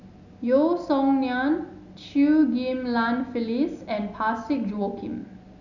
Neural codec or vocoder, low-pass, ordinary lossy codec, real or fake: none; 7.2 kHz; none; real